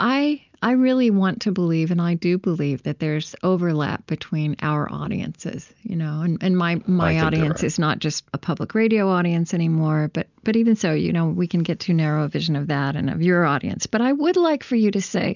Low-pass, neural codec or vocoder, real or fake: 7.2 kHz; none; real